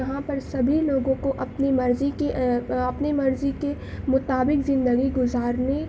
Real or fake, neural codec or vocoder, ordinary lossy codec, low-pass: real; none; none; none